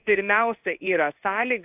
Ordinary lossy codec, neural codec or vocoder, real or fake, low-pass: AAC, 32 kbps; codec, 16 kHz in and 24 kHz out, 1 kbps, XY-Tokenizer; fake; 3.6 kHz